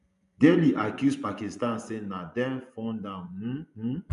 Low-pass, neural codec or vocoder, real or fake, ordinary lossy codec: 10.8 kHz; vocoder, 24 kHz, 100 mel bands, Vocos; fake; none